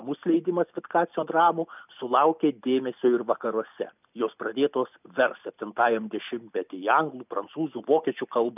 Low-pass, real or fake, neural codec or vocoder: 3.6 kHz; real; none